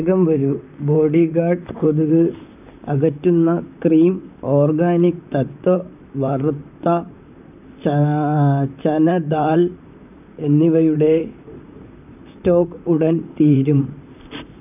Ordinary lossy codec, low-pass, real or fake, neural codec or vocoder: none; 3.6 kHz; fake; vocoder, 44.1 kHz, 128 mel bands, Pupu-Vocoder